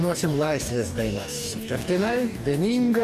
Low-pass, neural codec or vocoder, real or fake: 14.4 kHz; codec, 44.1 kHz, 2.6 kbps, DAC; fake